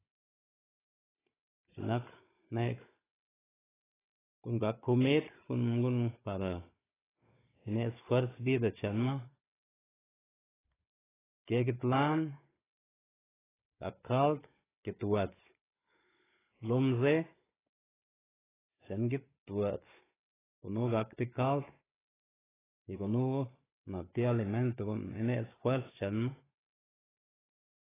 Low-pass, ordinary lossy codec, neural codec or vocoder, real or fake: 3.6 kHz; AAC, 16 kbps; codec, 16 kHz, 16 kbps, FunCodec, trained on Chinese and English, 50 frames a second; fake